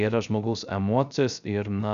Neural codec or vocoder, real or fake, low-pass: codec, 16 kHz, 0.3 kbps, FocalCodec; fake; 7.2 kHz